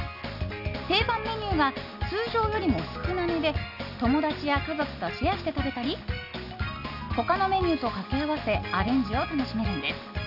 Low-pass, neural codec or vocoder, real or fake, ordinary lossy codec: 5.4 kHz; none; real; none